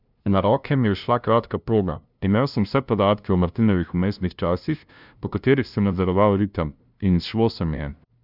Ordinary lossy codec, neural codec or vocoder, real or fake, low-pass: none; codec, 16 kHz, 0.5 kbps, FunCodec, trained on LibriTTS, 25 frames a second; fake; 5.4 kHz